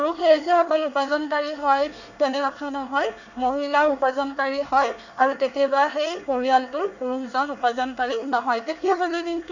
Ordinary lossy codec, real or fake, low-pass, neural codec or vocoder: none; fake; 7.2 kHz; codec, 24 kHz, 1 kbps, SNAC